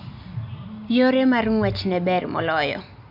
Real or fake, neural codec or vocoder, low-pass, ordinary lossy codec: real; none; 5.4 kHz; none